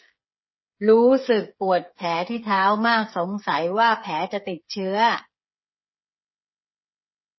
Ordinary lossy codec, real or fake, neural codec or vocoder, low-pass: MP3, 24 kbps; fake; codec, 16 kHz, 8 kbps, FreqCodec, smaller model; 7.2 kHz